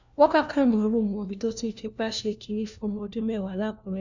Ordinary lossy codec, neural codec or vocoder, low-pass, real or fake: none; codec, 16 kHz, 1 kbps, FunCodec, trained on LibriTTS, 50 frames a second; 7.2 kHz; fake